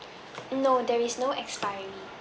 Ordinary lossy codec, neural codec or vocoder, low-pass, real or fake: none; none; none; real